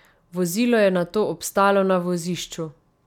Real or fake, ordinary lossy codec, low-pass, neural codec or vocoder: real; none; 19.8 kHz; none